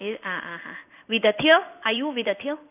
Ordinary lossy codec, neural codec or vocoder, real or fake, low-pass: none; codec, 16 kHz in and 24 kHz out, 1 kbps, XY-Tokenizer; fake; 3.6 kHz